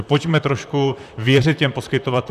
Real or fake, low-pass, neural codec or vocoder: fake; 14.4 kHz; vocoder, 44.1 kHz, 128 mel bands, Pupu-Vocoder